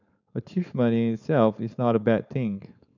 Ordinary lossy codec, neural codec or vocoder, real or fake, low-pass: none; codec, 16 kHz, 4.8 kbps, FACodec; fake; 7.2 kHz